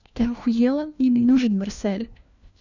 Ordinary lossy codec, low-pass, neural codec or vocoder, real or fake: none; 7.2 kHz; codec, 16 kHz, 1 kbps, FunCodec, trained on LibriTTS, 50 frames a second; fake